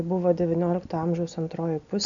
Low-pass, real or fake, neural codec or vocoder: 7.2 kHz; real; none